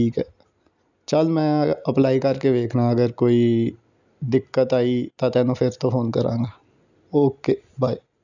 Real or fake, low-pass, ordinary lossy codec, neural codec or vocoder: real; 7.2 kHz; none; none